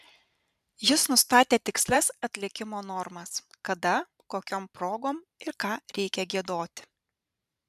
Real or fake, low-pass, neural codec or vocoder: fake; 14.4 kHz; vocoder, 44.1 kHz, 128 mel bands every 512 samples, BigVGAN v2